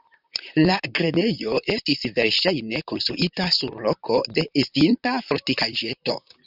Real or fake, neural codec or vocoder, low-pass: fake; vocoder, 44.1 kHz, 128 mel bands, Pupu-Vocoder; 5.4 kHz